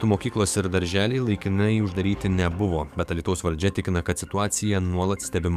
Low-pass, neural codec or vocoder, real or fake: 14.4 kHz; codec, 44.1 kHz, 7.8 kbps, DAC; fake